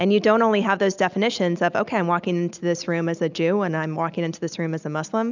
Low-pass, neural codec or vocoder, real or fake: 7.2 kHz; none; real